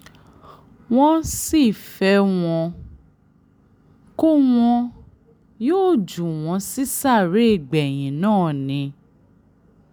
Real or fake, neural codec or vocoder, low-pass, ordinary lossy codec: real; none; none; none